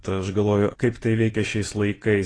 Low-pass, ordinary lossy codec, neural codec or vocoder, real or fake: 9.9 kHz; AAC, 32 kbps; vocoder, 24 kHz, 100 mel bands, Vocos; fake